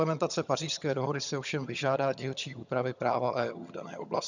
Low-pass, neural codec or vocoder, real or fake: 7.2 kHz; vocoder, 22.05 kHz, 80 mel bands, HiFi-GAN; fake